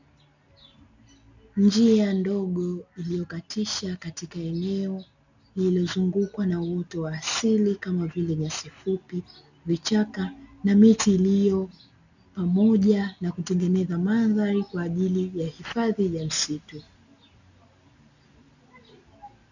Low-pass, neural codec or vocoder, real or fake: 7.2 kHz; none; real